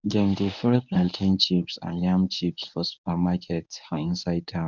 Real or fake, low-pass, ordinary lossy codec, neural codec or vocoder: fake; 7.2 kHz; none; codec, 24 kHz, 0.9 kbps, WavTokenizer, medium speech release version 1